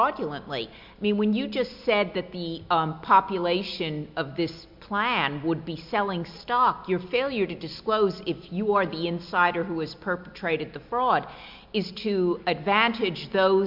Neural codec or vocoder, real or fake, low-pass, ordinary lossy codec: none; real; 5.4 kHz; MP3, 48 kbps